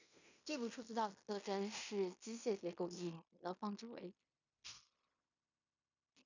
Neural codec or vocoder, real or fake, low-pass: codec, 16 kHz in and 24 kHz out, 0.9 kbps, LongCat-Audio-Codec, fine tuned four codebook decoder; fake; 7.2 kHz